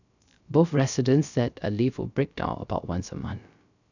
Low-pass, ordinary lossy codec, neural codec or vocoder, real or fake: 7.2 kHz; none; codec, 16 kHz, 0.3 kbps, FocalCodec; fake